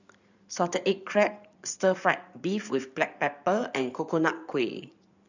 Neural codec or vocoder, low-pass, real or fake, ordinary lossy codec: codec, 16 kHz in and 24 kHz out, 2.2 kbps, FireRedTTS-2 codec; 7.2 kHz; fake; none